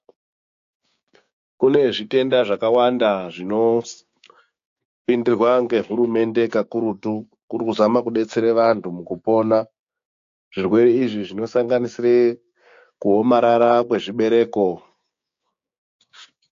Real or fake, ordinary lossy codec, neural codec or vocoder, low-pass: fake; AAC, 48 kbps; codec, 16 kHz, 6 kbps, DAC; 7.2 kHz